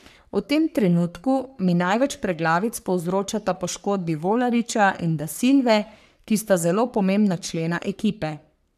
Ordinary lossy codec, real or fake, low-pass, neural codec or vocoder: none; fake; 14.4 kHz; codec, 44.1 kHz, 3.4 kbps, Pupu-Codec